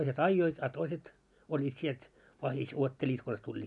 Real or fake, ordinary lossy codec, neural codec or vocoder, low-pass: real; none; none; 10.8 kHz